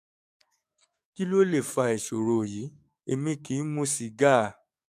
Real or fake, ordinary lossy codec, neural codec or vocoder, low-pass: fake; none; codec, 44.1 kHz, 7.8 kbps, DAC; 14.4 kHz